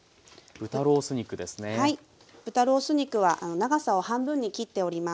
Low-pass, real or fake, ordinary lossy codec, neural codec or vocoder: none; real; none; none